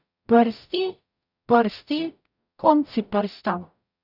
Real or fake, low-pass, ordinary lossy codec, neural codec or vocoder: fake; 5.4 kHz; none; codec, 44.1 kHz, 0.9 kbps, DAC